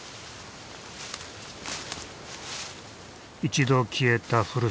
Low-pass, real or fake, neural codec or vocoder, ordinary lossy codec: none; real; none; none